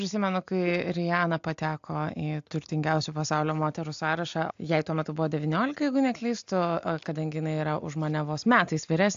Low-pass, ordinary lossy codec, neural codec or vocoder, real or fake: 7.2 kHz; AAC, 64 kbps; none; real